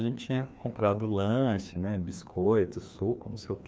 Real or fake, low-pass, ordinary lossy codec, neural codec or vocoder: fake; none; none; codec, 16 kHz, 2 kbps, FreqCodec, larger model